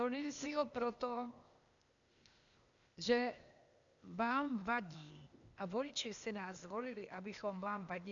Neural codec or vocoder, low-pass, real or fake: codec, 16 kHz, 0.8 kbps, ZipCodec; 7.2 kHz; fake